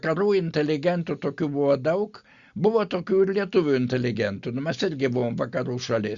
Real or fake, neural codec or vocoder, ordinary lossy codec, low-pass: real; none; Opus, 64 kbps; 7.2 kHz